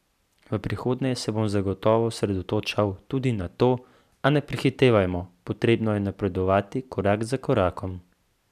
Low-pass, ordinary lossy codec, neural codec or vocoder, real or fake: 14.4 kHz; none; none; real